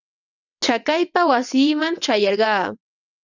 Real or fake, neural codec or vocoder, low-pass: fake; vocoder, 22.05 kHz, 80 mel bands, WaveNeXt; 7.2 kHz